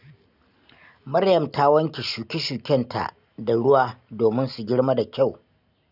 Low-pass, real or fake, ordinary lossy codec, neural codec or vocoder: 5.4 kHz; real; none; none